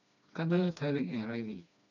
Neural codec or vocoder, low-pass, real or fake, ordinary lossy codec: codec, 16 kHz, 2 kbps, FreqCodec, smaller model; 7.2 kHz; fake; none